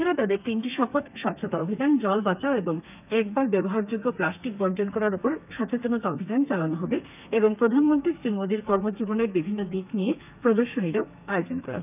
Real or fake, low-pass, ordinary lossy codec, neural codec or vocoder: fake; 3.6 kHz; none; codec, 32 kHz, 1.9 kbps, SNAC